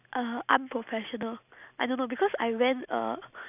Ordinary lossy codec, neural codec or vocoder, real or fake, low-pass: none; none; real; 3.6 kHz